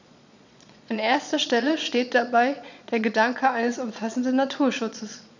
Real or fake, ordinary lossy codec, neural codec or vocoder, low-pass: fake; none; vocoder, 22.05 kHz, 80 mel bands, Vocos; 7.2 kHz